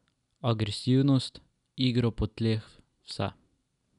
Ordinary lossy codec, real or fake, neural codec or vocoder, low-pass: none; real; none; 10.8 kHz